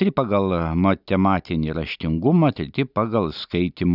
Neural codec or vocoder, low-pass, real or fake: none; 5.4 kHz; real